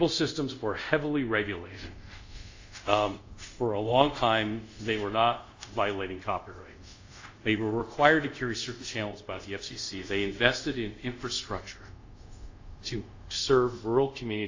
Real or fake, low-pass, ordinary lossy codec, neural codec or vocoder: fake; 7.2 kHz; AAC, 48 kbps; codec, 24 kHz, 0.5 kbps, DualCodec